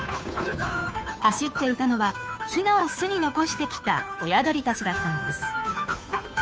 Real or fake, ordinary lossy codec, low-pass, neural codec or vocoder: fake; none; none; codec, 16 kHz, 2 kbps, FunCodec, trained on Chinese and English, 25 frames a second